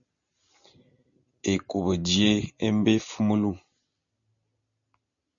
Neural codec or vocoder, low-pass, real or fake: none; 7.2 kHz; real